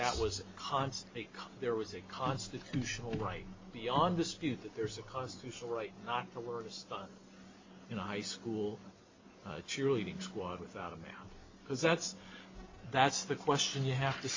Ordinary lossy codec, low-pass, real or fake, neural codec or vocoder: MP3, 48 kbps; 7.2 kHz; real; none